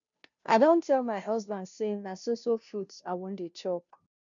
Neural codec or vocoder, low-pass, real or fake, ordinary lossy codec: codec, 16 kHz, 0.5 kbps, FunCodec, trained on Chinese and English, 25 frames a second; 7.2 kHz; fake; none